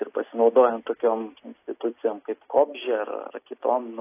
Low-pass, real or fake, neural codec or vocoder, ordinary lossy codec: 3.6 kHz; real; none; AAC, 24 kbps